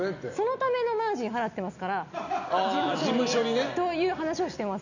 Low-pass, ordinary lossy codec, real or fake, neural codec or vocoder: 7.2 kHz; none; real; none